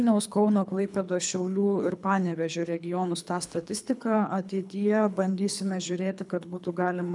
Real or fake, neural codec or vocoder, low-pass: fake; codec, 24 kHz, 3 kbps, HILCodec; 10.8 kHz